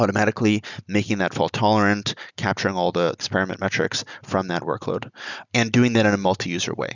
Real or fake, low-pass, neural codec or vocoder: real; 7.2 kHz; none